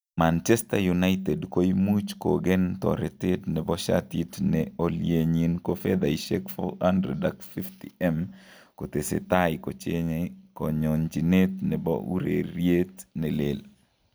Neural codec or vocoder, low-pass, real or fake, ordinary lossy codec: none; none; real; none